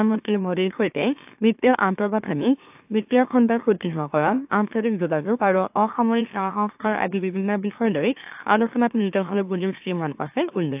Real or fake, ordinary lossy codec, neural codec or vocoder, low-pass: fake; AAC, 32 kbps; autoencoder, 44.1 kHz, a latent of 192 numbers a frame, MeloTTS; 3.6 kHz